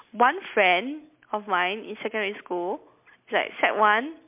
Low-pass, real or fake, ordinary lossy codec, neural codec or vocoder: 3.6 kHz; real; MP3, 32 kbps; none